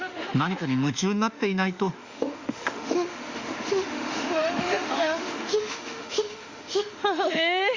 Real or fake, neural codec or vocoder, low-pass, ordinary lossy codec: fake; autoencoder, 48 kHz, 32 numbers a frame, DAC-VAE, trained on Japanese speech; 7.2 kHz; Opus, 64 kbps